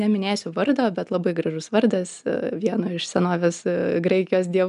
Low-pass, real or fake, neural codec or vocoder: 10.8 kHz; real; none